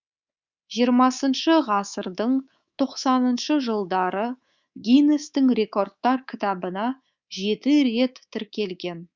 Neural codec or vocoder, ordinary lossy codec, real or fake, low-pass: codec, 24 kHz, 3.1 kbps, DualCodec; Opus, 64 kbps; fake; 7.2 kHz